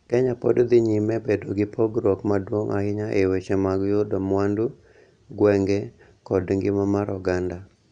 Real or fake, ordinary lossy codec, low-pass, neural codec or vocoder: real; none; 9.9 kHz; none